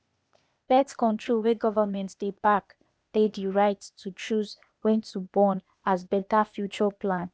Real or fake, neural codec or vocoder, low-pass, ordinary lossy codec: fake; codec, 16 kHz, 0.8 kbps, ZipCodec; none; none